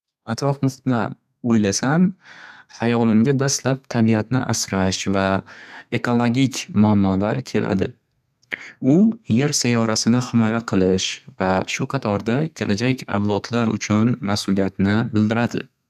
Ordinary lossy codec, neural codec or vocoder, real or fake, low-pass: none; codec, 32 kHz, 1.9 kbps, SNAC; fake; 14.4 kHz